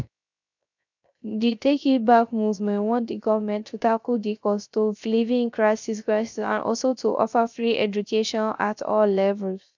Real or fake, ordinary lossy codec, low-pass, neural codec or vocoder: fake; none; 7.2 kHz; codec, 16 kHz, 0.3 kbps, FocalCodec